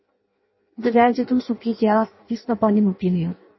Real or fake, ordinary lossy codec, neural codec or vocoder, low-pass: fake; MP3, 24 kbps; codec, 16 kHz in and 24 kHz out, 0.6 kbps, FireRedTTS-2 codec; 7.2 kHz